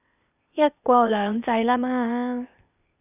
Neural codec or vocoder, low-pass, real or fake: codec, 16 kHz, 0.8 kbps, ZipCodec; 3.6 kHz; fake